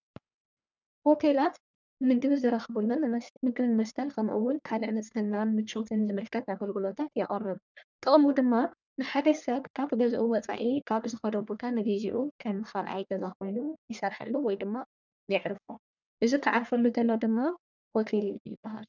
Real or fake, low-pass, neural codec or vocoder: fake; 7.2 kHz; codec, 44.1 kHz, 1.7 kbps, Pupu-Codec